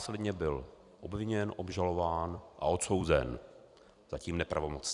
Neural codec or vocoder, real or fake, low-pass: vocoder, 44.1 kHz, 128 mel bands every 256 samples, BigVGAN v2; fake; 10.8 kHz